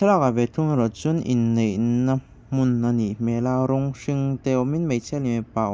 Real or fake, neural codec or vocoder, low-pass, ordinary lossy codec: real; none; none; none